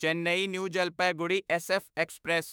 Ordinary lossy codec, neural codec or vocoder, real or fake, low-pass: none; autoencoder, 48 kHz, 32 numbers a frame, DAC-VAE, trained on Japanese speech; fake; none